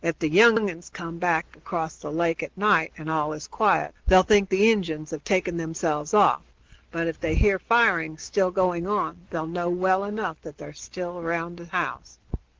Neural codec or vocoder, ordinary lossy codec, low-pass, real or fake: none; Opus, 16 kbps; 7.2 kHz; real